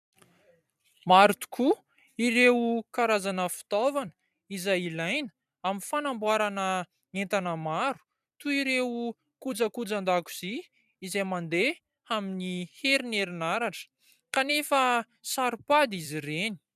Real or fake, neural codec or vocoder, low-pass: fake; vocoder, 44.1 kHz, 128 mel bands every 256 samples, BigVGAN v2; 14.4 kHz